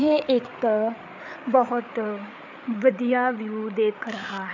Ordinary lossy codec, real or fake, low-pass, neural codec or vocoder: none; fake; 7.2 kHz; codec, 16 kHz, 8 kbps, FreqCodec, larger model